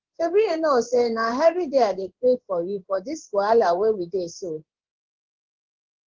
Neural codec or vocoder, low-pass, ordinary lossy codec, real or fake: codec, 16 kHz in and 24 kHz out, 1 kbps, XY-Tokenizer; 7.2 kHz; Opus, 16 kbps; fake